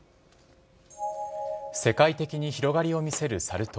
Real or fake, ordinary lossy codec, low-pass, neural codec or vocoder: real; none; none; none